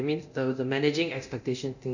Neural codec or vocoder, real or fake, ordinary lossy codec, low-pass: codec, 24 kHz, 0.9 kbps, DualCodec; fake; none; 7.2 kHz